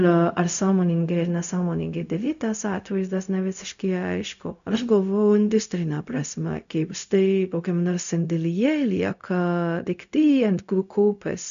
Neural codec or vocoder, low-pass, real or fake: codec, 16 kHz, 0.4 kbps, LongCat-Audio-Codec; 7.2 kHz; fake